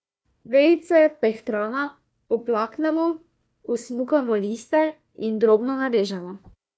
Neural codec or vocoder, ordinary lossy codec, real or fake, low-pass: codec, 16 kHz, 1 kbps, FunCodec, trained on Chinese and English, 50 frames a second; none; fake; none